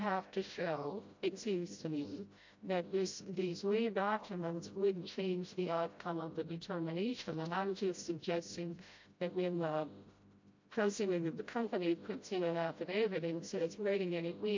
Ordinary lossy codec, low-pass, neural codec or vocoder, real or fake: MP3, 48 kbps; 7.2 kHz; codec, 16 kHz, 0.5 kbps, FreqCodec, smaller model; fake